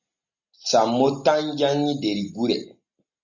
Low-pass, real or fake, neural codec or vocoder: 7.2 kHz; real; none